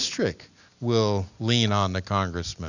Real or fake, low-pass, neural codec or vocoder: real; 7.2 kHz; none